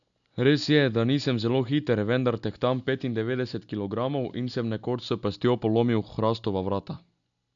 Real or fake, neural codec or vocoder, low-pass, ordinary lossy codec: real; none; 7.2 kHz; none